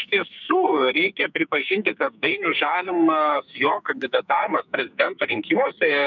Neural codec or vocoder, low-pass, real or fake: codec, 44.1 kHz, 2.6 kbps, SNAC; 7.2 kHz; fake